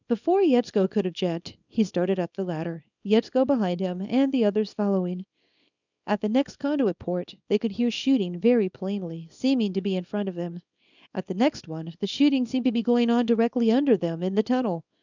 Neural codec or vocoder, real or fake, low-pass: codec, 24 kHz, 0.9 kbps, WavTokenizer, small release; fake; 7.2 kHz